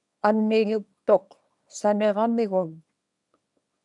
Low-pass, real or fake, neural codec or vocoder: 10.8 kHz; fake; codec, 24 kHz, 0.9 kbps, WavTokenizer, small release